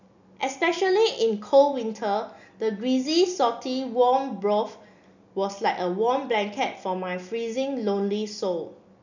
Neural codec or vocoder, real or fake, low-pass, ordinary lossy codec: none; real; 7.2 kHz; none